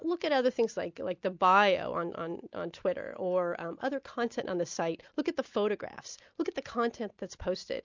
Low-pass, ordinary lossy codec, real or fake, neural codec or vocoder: 7.2 kHz; MP3, 64 kbps; real; none